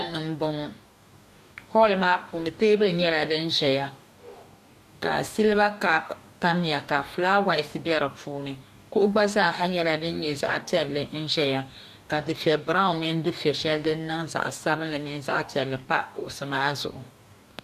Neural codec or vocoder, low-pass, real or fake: codec, 44.1 kHz, 2.6 kbps, DAC; 14.4 kHz; fake